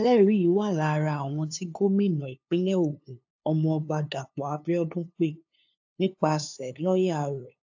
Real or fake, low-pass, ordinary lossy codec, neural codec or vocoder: fake; 7.2 kHz; AAC, 48 kbps; codec, 16 kHz, 2 kbps, FunCodec, trained on LibriTTS, 25 frames a second